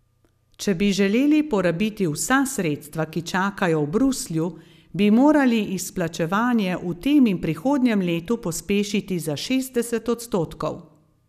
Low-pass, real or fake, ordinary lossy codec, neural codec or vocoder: 14.4 kHz; real; none; none